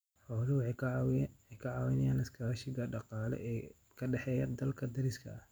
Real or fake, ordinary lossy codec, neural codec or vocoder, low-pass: real; none; none; none